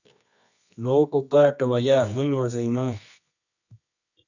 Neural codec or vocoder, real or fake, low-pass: codec, 24 kHz, 0.9 kbps, WavTokenizer, medium music audio release; fake; 7.2 kHz